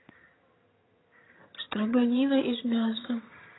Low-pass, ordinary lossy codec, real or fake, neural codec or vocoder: 7.2 kHz; AAC, 16 kbps; fake; vocoder, 22.05 kHz, 80 mel bands, HiFi-GAN